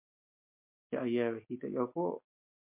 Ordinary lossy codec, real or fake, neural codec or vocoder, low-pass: MP3, 32 kbps; real; none; 3.6 kHz